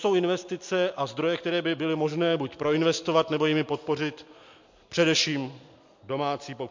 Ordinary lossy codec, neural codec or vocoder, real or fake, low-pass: MP3, 48 kbps; none; real; 7.2 kHz